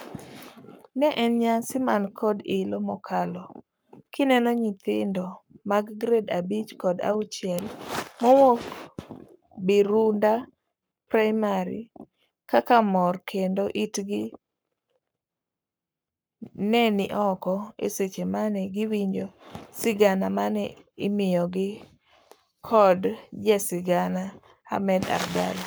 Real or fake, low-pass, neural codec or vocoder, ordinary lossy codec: fake; none; codec, 44.1 kHz, 7.8 kbps, Pupu-Codec; none